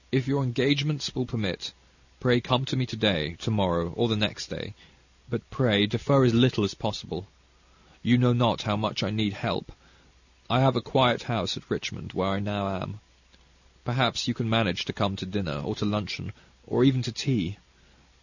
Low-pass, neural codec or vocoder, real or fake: 7.2 kHz; none; real